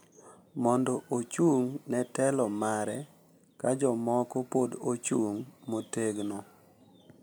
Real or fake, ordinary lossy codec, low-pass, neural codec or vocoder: real; none; none; none